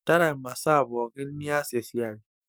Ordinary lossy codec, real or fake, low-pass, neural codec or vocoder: none; fake; none; codec, 44.1 kHz, 7.8 kbps, DAC